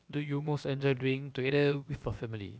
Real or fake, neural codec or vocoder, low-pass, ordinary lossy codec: fake; codec, 16 kHz, about 1 kbps, DyCAST, with the encoder's durations; none; none